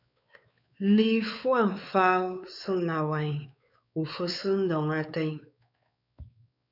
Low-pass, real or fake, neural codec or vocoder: 5.4 kHz; fake; codec, 16 kHz, 4 kbps, X-Codec, WavLM features, trained on Multilingual LibriSpeech